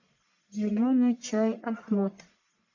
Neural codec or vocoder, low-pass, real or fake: codec, 44.1 kHz, 1.7 kbps, Pupu-Codec; 7.2 kHz; fake